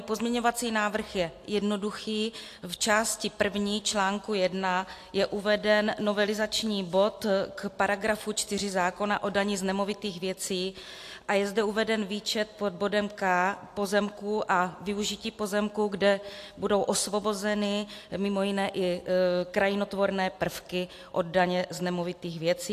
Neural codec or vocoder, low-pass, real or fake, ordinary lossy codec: none; 14.4 kHz; real; AAC, 64 kbps